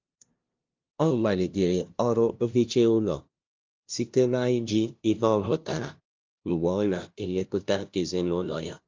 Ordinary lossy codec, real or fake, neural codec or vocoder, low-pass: Opus, 24 kbps; fake; codec, 16 kHz, 0.5 kbps, FunCodec, trained on LibriTTS, 25 frames a second; 7.2 kHz